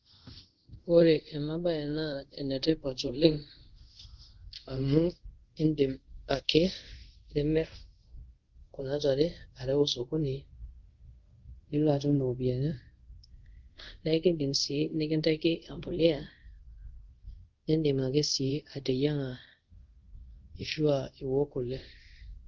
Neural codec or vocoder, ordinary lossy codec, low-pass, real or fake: codec, 24 kHz, 0.5 kbps, DualCodec; Opus, 16 kbps; 7.2 kHz; fake